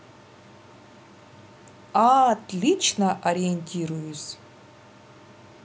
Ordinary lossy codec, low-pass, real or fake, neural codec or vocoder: none; none; real; none